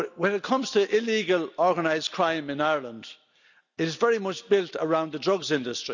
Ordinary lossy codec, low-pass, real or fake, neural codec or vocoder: none; 7.2 kHz; real; none